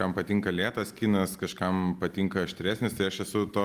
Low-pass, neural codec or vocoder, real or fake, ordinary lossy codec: 14.4 kHz; none; real; Opus, 32 kbps